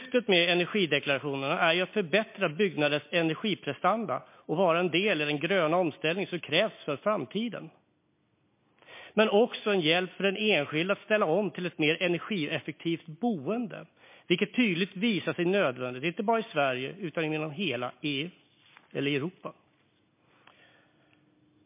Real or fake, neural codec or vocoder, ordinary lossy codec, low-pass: real; none; MP3, 24 kbps; 3.6 kHz